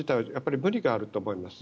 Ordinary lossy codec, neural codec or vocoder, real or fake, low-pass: none; none; real; none